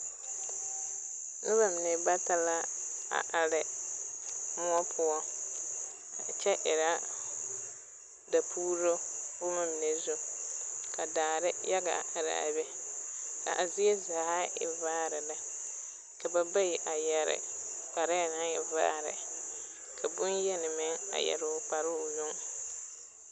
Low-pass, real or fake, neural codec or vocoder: 10.8 kHz; real; none